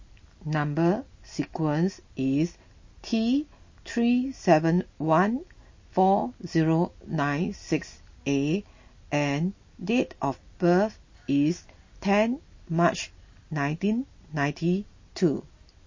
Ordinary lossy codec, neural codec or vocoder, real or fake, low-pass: MP3, 32 kbps; none; real; 7.2 kHz